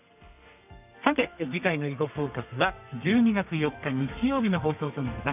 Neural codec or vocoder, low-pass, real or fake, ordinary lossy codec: codec, 32 kHz, 1.9 kbps, SNAC; 3.6 kHz; fake; none